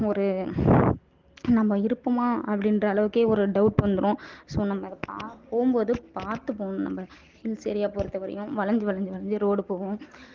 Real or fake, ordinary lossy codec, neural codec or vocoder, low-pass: real; Opus, 16 kbps; none; 7.2 kHz